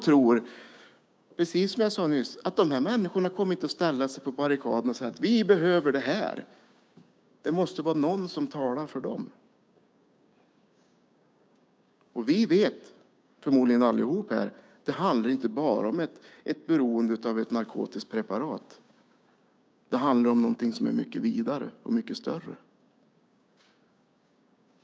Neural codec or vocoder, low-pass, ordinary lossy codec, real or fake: codec, 16 kHz, 6 kbps, DAC; none; none; fake